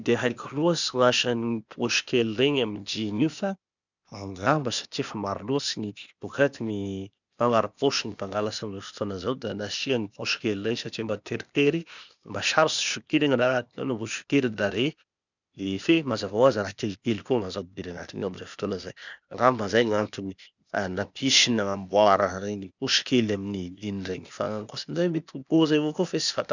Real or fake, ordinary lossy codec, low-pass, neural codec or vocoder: fake; none; 7.2 kHz; codec, 16 kHz, 0.8 kbps, ZipCodec